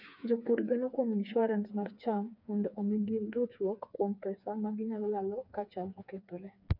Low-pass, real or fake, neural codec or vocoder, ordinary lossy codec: 5.4 kHz; fake; codec, 16 kHz, 4 kbps, FreqCodec, smaller model; none